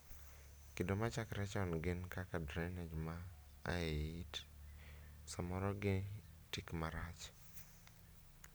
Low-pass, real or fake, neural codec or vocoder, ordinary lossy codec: none; real; none; none